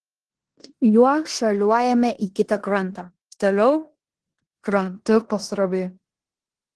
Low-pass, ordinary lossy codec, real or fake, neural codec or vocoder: 10.8 kHz; Opus, 16 kbps; fake; codec, 16 kHz in and 24 kHz out, 0.9 kbps, LongCat-Audio-Codec, four codebook decoder